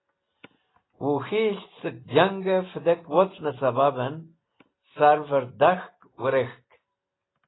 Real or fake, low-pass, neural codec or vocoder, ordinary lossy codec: real; 7.2 kHz; none; AAC, 16 kbps